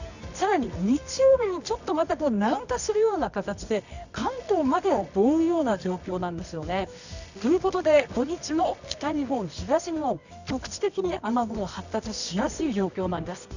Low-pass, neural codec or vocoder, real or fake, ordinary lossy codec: 7.2 kHz; codec, 24 kHz, 0.9 kbps, WavTokenizer, medium music audio release; fake; none